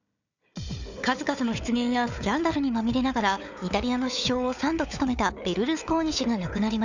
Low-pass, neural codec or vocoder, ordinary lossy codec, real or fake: 7.2 kHz; codec, 16 kHz, 4 kbps, FunCodec, trained on Chinese and English, 50 frames a second; none; fake